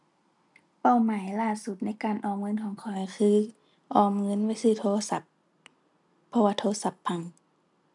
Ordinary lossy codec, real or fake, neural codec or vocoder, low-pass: none; real; none; 10.8 kHz